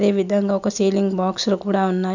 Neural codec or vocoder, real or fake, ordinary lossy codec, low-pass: none; real; none; 7.2 kHz